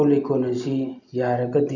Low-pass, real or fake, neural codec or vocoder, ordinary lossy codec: 7.2 kHz; real; none; none